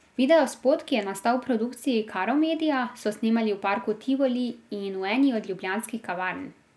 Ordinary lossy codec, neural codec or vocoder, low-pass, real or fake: none; none; none; real